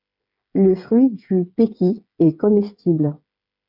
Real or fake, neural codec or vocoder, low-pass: fake; codec, 16 kHz, 8 kbps, FreqCodec, smaller model; 5.4 kHz